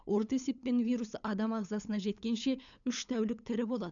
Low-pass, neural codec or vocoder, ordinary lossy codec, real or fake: 7.2 kHz; codec, 16 kHz, 8 kbps, FunCodec, trained on LibriTTS, 25 frames a second; none; fake